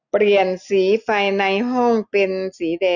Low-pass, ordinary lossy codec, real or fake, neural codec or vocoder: 7.2 kHz; none; real; none